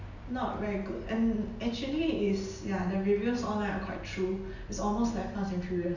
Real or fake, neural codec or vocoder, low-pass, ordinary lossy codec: real; none; 7.2 kHz; none